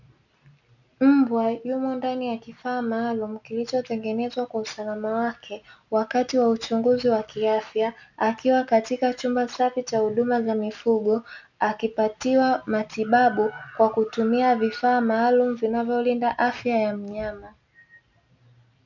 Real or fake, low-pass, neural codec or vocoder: real; 7.2 kHz; none